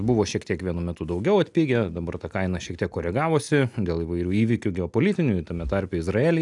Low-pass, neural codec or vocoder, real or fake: 10.8 kHz; none; real